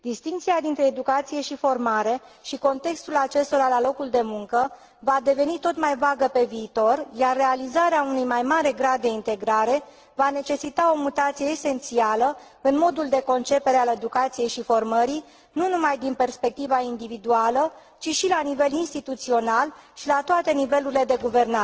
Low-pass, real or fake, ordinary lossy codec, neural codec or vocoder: 7.2 kHz; real; Opus, 16 kbps; none